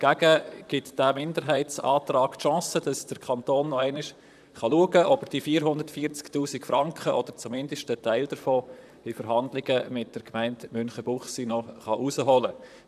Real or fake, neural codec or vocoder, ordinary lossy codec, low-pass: fake; vocoder, 44.1 kHz, 128 mel bands, Pupu-Vocoder; none; 14.4 kHz